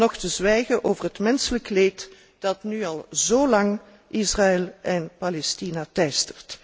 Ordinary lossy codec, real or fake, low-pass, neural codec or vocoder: none; real; none; none